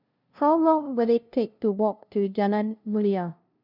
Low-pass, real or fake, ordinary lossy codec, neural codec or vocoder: 5.4 kHz; fake; none; codec, 16 kHz, 0.5 kbps, FunCodec, trained on LibriTTS, 25 frames a second